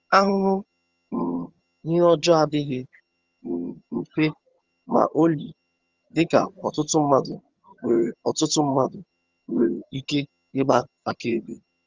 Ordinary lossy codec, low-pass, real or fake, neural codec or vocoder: Opus, 32 kbps; 7.2 kHz; fake; vocoder, 22.05 kHz, 80 mel bands, HiFi-GAN